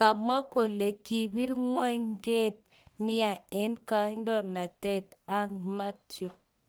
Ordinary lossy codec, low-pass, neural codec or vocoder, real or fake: none; none; codec, 44.1 kHz, 1.7 kbps, Pupu-Codec; fake